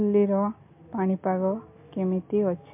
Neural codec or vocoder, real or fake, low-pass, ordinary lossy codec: none; real; 3.6 kHz; MP3, 24 kbps